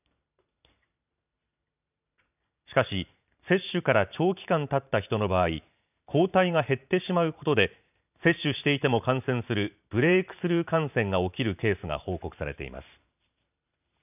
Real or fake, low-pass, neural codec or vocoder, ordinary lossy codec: fake; 3.6 kHz; vocoder, 44.1 kHz, 128 mel bands every 512 samples, BigVGAN v2; none